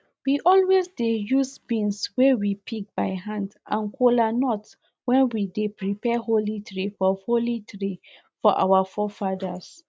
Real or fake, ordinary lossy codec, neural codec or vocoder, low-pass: real; none; none; none